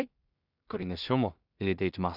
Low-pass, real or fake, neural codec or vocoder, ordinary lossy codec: 5.4 kHz; fake; codec, 16 kHz in and 24 kHz out, 0.4 kbps, LongCat-Audio-Codec, two codebook decoder; none